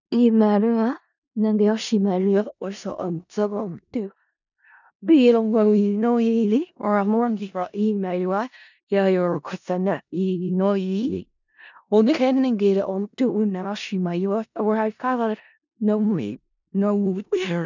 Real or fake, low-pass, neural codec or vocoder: fake; 7.2 kHz; codec, 16 kHz in and 24 kHz out, 0.4 kbps, LongCat-Audio-Codec, four codebook decoder